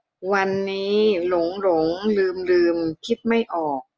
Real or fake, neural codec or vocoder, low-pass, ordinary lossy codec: real; none; 7.2 kHz; Opus, 24 kbps